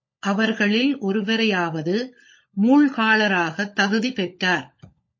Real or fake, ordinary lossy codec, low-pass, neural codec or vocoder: fake; MP3, 32 kbps; 7.2 kHz; codec, 16 kHz, 16 kbps, FunCodec, trained on LibriTTS, 50 frames a second